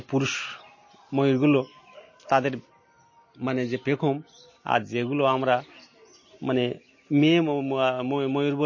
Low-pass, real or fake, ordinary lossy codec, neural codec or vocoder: 7.2 kHz; real; MP3, 32 kbps; none